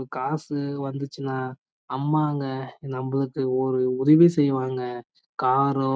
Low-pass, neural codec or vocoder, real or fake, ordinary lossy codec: none; none; real; none